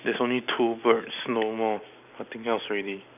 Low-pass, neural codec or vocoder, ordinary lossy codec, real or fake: 3.6 kHz; none; none; real